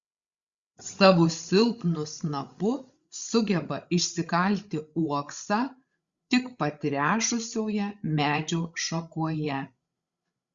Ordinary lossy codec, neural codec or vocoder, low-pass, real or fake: Opus, 64 kbps; codec, 16 kHz, 16 kbps, FreqCodec, larger model; 7.2 kHz; fake